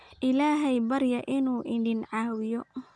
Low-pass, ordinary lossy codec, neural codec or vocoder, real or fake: 9.9 kHz; none; none; real